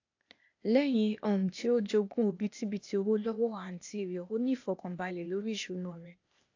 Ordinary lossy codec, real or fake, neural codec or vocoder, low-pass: AAC, 48 kbps; fake; codec, 16 kHz, 0.8 kbps, ZipCodec; 7.2 kHz